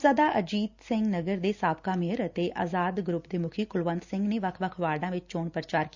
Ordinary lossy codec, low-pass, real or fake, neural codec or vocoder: Opus, 64 kbps; 7.2 kHz; real; none